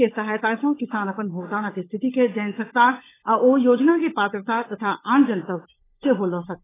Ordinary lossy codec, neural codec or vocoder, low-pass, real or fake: AAC, 16 kbps; codec, 16 kHz, 16 kbps, FunCodec, trained on LibriTTS, 50 frames a second; 3.6 kHz; fake